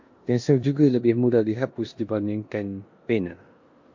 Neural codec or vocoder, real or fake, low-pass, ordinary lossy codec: codec, 16 kHz in and 24 kHz out, 0.9 kbps, LongCat-Audio-Codec, four codebook decoder; fake; 7.2 kHz; MP3, 48 kbps